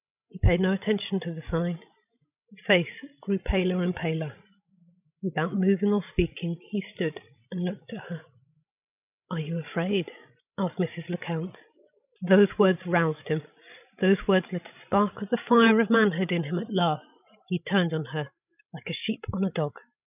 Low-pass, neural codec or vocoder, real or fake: 3.6 kHz; codec, 16 kHz, 16 kbps, FreqCodec, larger model; fake